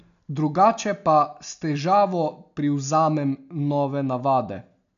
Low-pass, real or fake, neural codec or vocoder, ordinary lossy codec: 7.2 kHz; real; none; none